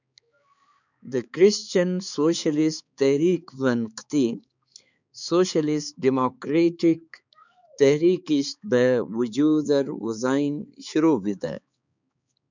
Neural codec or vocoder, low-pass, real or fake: codec, 16 kHz, 4 kbps, X-Codec, HuBERT features, trained on balanced general audio; 7.2 kHz; fake